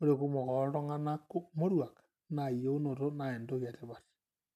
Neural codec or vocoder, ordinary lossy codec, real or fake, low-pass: none; none; real; 14.4 kHz